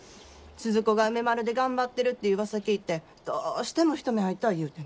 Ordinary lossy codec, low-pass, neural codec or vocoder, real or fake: none; none; none; real